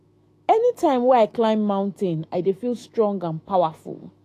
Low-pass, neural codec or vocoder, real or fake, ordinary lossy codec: 14.4 kHz; autoencoder, 48 kHz, 128 numbers a frame, DAC-VAE, trained on Japanese speech; fake; AAC, 48 kbps